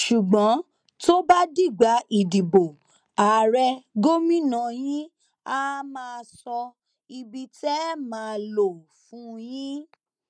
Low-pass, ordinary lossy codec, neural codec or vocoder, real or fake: 9.9 kHz; none; none; real